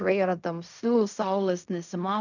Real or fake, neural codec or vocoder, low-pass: fake; codec, 16 kHz in and 24 kHz out, 0.4 kbps, LongCat-Audio-Codec, fine tuned four codebook decoder; 7.2 kHz